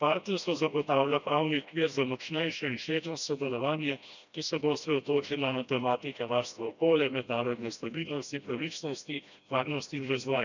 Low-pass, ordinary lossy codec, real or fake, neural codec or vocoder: 7.2 kHz; none; fake; codec, 16 kHz, 1 kbps, FreqCodec, smaller model